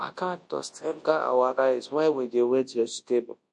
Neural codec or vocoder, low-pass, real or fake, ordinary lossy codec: codec, 24 kHz, 0.9 kbps, WavTokenizer, large speech release; 10.8 kHz; fake; MP3, 48 kbps